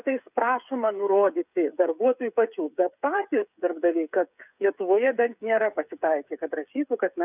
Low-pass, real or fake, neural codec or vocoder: 3.6 kHz; fake; codec, 16 kHz, 8 kbps, FreqCodec, smaller model